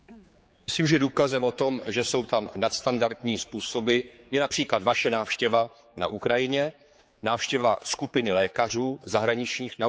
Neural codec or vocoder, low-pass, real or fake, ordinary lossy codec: codec, 16 kHz, 4 kbps, X-Codec, HuBERT features, trained on general audio; none; fake; none